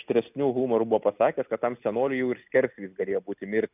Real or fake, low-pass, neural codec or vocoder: real; 3.6 kHz; none